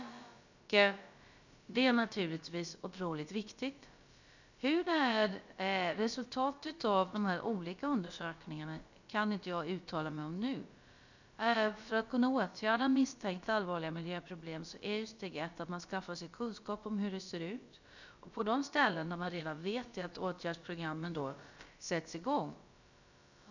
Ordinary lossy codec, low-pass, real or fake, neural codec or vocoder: none; 7.2 kHz; fake; codec, 16 kHz, about 1 kbps, DyCAST, with the encoder's durations